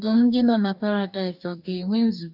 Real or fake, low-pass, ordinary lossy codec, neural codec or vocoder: fake; 5.4 kHz; none; codec, 44.1 kHz, 2.6 kbps, DAC